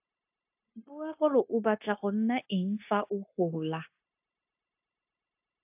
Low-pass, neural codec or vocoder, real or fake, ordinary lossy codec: 3.6 kHz; codec, 16 kHz, 0.9 kbps, LongCat-Audio-Codec; fake; AAC, 32 kbps